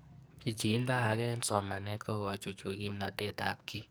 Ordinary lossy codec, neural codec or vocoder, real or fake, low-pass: none; codec, 44.1 kHz, 2.6 kbps, SNAC; fake; none